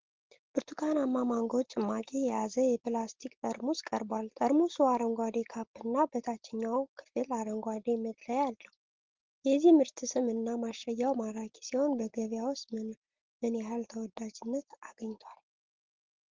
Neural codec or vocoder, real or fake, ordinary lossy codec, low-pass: none; real; Opus, 16 kbps; 7.2 kHz